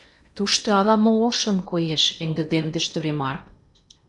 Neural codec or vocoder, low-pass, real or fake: codec, 16 kHz in and 24 kHz out, 0.8 kbps, FocalCodec, streaming, 65536 codes; 10.8 kHz; fake